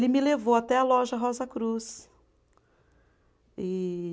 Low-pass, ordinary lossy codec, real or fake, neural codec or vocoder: none; none; real; none